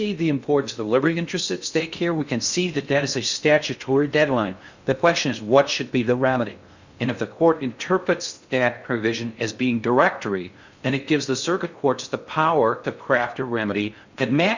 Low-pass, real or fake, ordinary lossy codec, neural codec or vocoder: 7.2 kHz; fake; Opus, 64 kbps; codec, 16 kHz in and 24 kHz out, 0.6 kbps, FocalCodec, streaming, 2048 codes